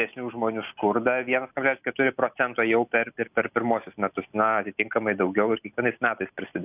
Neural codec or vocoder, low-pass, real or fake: none; 3.6 kHz; real